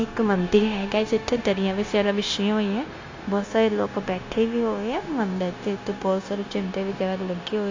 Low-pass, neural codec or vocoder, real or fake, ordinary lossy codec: 7.2 kHz; codec, 16 kHz, 0.9 kbps, LongCat-Audio-Codec; fake; AAC, 48 kbps